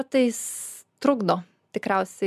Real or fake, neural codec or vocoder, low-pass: real; none; 14.4 kHz